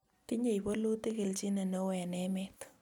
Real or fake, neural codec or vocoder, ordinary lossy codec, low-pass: real; none; none; 19.8 kHz